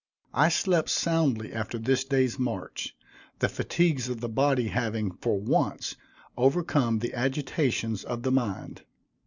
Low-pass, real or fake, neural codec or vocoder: 7.2 kHz; real; none